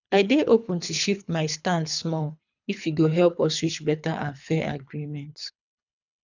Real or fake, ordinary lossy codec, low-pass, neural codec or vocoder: fake; none; 7.2 kHz; codec, 24 kHz, 3 kbps, HILCodec